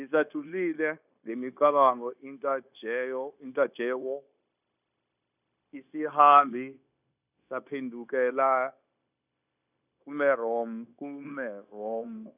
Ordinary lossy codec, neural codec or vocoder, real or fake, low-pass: none; codec, 16 kHz, 0.9 kbps, LongCat-Audio-Codec; fake; 3.6 kHz